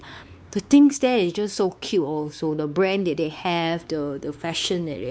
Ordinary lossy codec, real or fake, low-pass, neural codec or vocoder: none; fake; none; codec, 16 kHz, 4 kbps, X-Codec, WavLM features, trained on Multilingual LibriSpeech